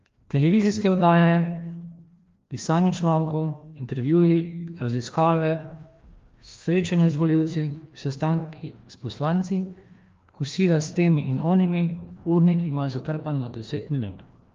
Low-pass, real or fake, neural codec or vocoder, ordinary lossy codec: 7.2 kHz; fake; codec, 16 kHz, 1 kbps, FreqCodec, larger model; Opus, 32 kbps